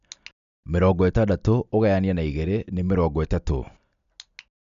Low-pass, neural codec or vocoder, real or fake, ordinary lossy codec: 7.2 kHz; none; real; none